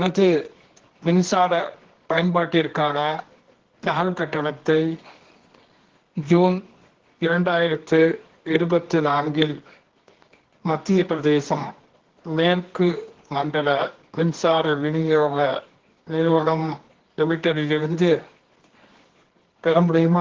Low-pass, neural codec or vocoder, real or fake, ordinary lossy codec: 7.2 kHz; codec, 24 kHz, 0.9 kbps, WavTokenizer, medium music audio release; fake; Opus, 16 kbps